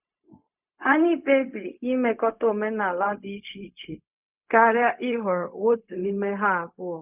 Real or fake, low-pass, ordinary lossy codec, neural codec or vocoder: fake; 3.6 kHz; none; codec, 16 kHz, 0.4 kbps, LongCat-Audio-Codec